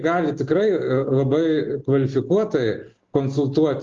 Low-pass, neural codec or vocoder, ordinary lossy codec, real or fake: 7.2 kHz; none; Opus, 32 kbps; real